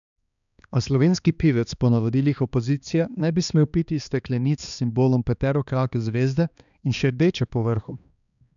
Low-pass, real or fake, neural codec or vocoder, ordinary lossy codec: 7.2 kHz; fake; codec, 16 kHz, 2 kbps, X-Codec, HuBERT features, trained on balanced general audio; none